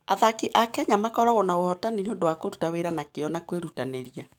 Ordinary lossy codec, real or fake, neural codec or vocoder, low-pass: none; fake; codec, 44.1 kHz, 7.8 kbps, DAC; 19.8 kHz